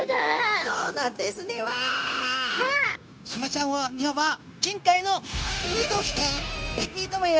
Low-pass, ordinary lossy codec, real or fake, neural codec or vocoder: none; none; fake; codec, 16 kHz, 0.9 kbps, LongCat-Audio-Codec